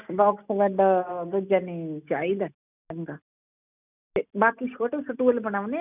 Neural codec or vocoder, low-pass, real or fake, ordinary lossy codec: none; 3.6 kHz; real; none